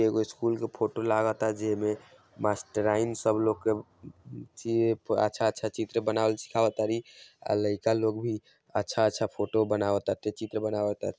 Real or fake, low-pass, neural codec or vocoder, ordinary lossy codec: real; none; none; none